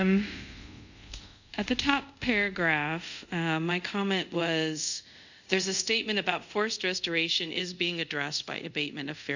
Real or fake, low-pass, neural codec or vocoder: fake; 7.2 kHz; codec, 24 kHz, 0.5 kbps, DualCodec